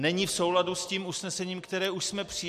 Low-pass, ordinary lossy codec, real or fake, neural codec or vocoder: 14.4 kHz; MP3, 64 kbps; real; none